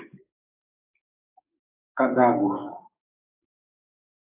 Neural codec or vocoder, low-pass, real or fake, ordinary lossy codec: codec, 16 kHz, 6 kbps, DAC; 3.6 kHz; fake; AAC, 32 kbps